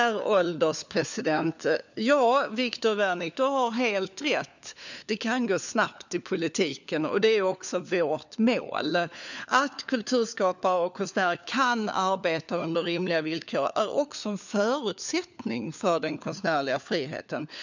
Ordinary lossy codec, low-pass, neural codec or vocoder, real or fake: none; 7.2 kHz; codec, 16 kHz, 4 kbps, FunCodec, trained on LibriTTS, 50 frames a second; fake